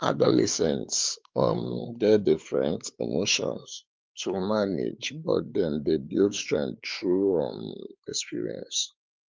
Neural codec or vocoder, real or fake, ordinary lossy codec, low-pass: codec, 16 kHz, 4 kbps, X-Codec, WavLM features, trained on Multilingual LibriSpeech; fake; Opus, 24 kbps; 7.2 kHz